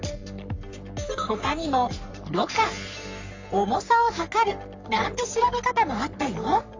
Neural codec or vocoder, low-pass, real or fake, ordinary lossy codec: codec, 44.1 kHz, 3.4 kbps, Pupu-Codec; 7.2 kHz; fake; AAC, 48 kbps